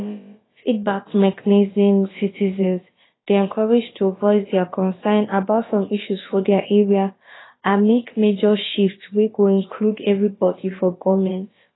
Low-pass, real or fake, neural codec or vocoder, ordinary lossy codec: 7.2 kHz; fake; codec, 16 kHz, about 1 kbps, DyCAST, with the encoder's durations; AAC, 16 kbps